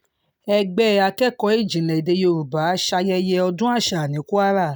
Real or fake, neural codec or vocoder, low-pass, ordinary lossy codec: real; none; none; none